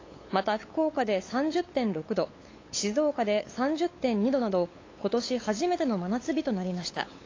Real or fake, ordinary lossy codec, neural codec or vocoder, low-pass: fake; AAC, 32 kbps; codec, 16 kHz, 8 kbps, FunCodec, trained on LibriTTS, 25 frames a second; 7.2 kHz